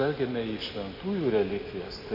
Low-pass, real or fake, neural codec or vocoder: 5.4 kHz; real; none